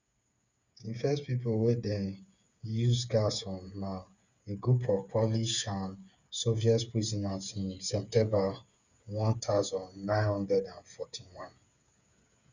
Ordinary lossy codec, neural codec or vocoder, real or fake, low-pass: none; codec, 16 kHz, 8 kbps, FreqCodec, smaller model; fake; 7.2 kHz